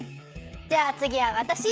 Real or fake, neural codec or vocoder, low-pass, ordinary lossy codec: fake; codec, 16 kHz, 16 kbps, FreqCodec, smaller model; none; none